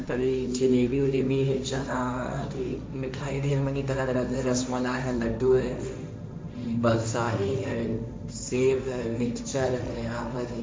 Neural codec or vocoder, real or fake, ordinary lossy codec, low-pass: codec, 16 kHz, 1.1 kbps, Voila-Tokenizer; fake; none; none